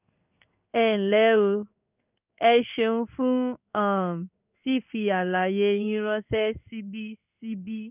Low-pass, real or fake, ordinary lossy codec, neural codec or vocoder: 3.6 kHz; fake; none; codec, 16 kHz in and 24 kHz out, 1 kbps, XY-Tokenizer